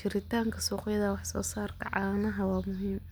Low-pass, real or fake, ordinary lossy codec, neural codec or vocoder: none; real; none; none